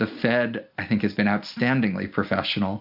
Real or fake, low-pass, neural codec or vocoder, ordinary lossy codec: real; 5.4 kHz; none; MP3, 48 kbps